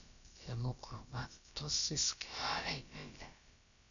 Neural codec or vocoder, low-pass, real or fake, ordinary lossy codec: codec, 16 kHz, about 1 kbps, DyCAST, with the encoder's durations; 7.2 kHz; fake; none